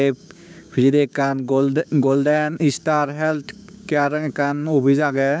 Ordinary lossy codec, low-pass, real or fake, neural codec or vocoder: none; none; fake; codec, 16 kHz, 6 kbps, DAC